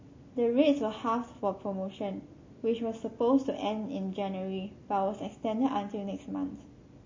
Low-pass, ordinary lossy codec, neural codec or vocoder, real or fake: 7.2 kHz; MP3, 32 kbps; none; real